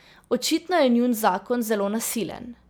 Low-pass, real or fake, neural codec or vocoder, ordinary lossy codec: none; real; none; none